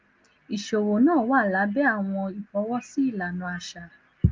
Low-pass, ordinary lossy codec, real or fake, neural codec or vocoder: 7.2 kHz; Opus, 24 kbps; real; none